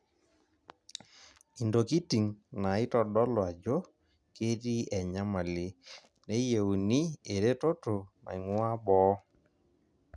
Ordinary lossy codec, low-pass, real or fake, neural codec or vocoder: none; none; real; none